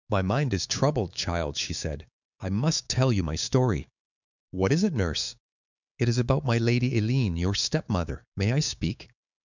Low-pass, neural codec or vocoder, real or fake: 7.2 kHz; codec, 16 kHz, 6 kbps, DAC; fake